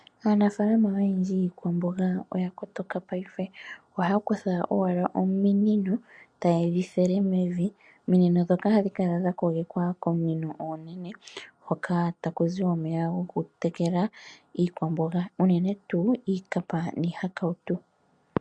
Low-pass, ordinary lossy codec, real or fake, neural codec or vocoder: 9.9 kHz; MP3, 64 kbps; real; none